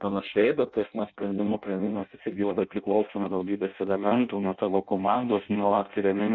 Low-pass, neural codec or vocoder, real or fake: 7.2 kHz; codec, 16 kHz in and 24 kHz out, 0.6 kbps, FireRedTTS-2 codec; fake